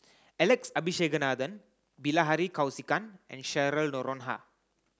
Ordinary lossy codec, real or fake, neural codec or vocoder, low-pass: none; real; none; none